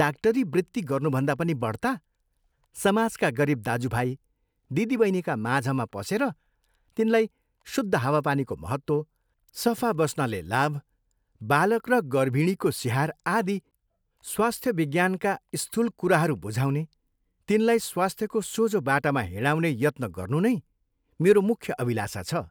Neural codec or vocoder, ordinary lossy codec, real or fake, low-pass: none; none; real; none